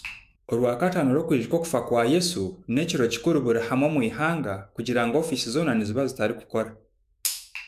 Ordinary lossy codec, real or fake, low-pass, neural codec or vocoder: none; fake; 14.4 kHz; autoencoder, 48 kHz, 128 numbers a frame, DAC-VAE, trained on Japanese speech